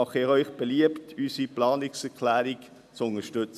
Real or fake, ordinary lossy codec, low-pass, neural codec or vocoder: real; none; 14.4 kHz; none